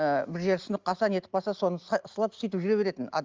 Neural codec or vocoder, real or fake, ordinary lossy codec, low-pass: none; real; Opus, 32 kbps; 7.2 kHz